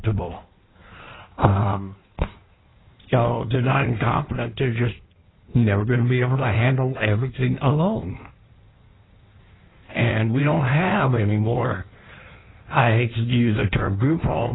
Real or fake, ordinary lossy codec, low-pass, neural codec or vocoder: fake; AAC, 16 kbps; 7.2 kHz; codec, 16 kHz in and 24 kHz out, 1.1 kbps, FireRedTTS-2 codec